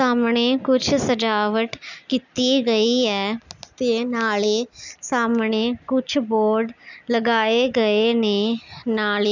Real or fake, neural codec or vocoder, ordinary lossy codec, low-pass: real; none; none; 7.2 kHz